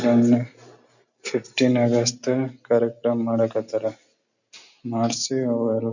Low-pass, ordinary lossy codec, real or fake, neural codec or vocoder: 7.2 kHz; none; real; none